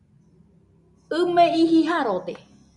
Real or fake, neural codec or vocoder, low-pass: real; none; 10.8 kHz